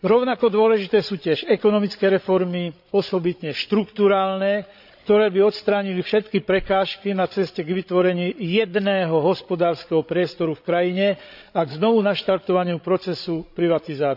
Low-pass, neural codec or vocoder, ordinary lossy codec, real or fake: 5.4 kHz; codec, 16 kHz, 16 kbps, FreqCodec, larger model; none; fake